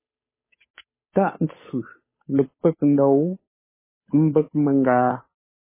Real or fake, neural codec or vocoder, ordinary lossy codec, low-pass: fake; codec, 16 kHz, 8 kbps, FunCodec, trained on Chinese and English, 25 frames a second; MP3, 16 kbps; 3.6 kHz